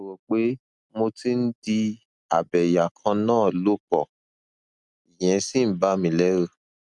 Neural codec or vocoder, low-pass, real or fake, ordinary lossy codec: none; 10.8 kHz; real; none